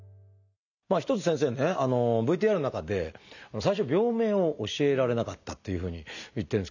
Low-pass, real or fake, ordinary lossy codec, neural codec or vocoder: 7.2 kHz; real; none; none